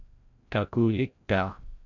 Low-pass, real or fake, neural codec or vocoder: 7.2 kHz; fake; codec, 16 kHz, 0.5 kbps, FreqCodec, larger model